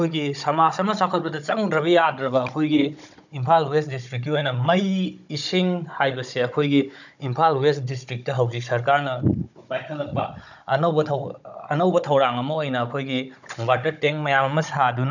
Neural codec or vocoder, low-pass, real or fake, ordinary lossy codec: codec, 16 kHz, 16 kbps, FunCodec, trained on Chinese and English, 50 frames a second; 7.2 kHz; fake; none